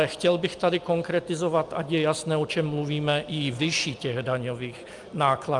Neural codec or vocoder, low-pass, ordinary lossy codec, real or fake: none; 10.8 kHz; Opus, 32 kbps; real